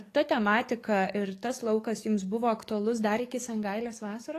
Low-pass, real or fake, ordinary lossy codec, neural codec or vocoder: 14.4 kHz; fake; AAC, 48 kbps; codec, 44.1 kHz, 7.8 kbps, DAC